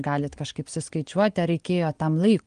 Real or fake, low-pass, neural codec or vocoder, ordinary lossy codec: real; 9.9 kHz; none; Opus, 24 kbps